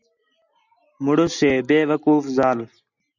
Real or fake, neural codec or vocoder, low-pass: real; none; 7.2 kHz